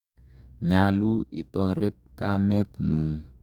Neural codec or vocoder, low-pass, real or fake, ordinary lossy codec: codec, 44.1 kHz, 2.6 kbps, DAC; 19.8 kHz; fake; none